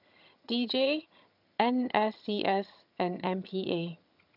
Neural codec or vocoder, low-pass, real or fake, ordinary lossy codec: vocoder, 22.05 kHz, 80 mel bands, HiFi-GAN; 5.4 kHz; fake; none